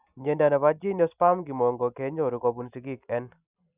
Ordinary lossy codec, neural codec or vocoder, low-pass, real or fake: none; none; 3.6 kHz; real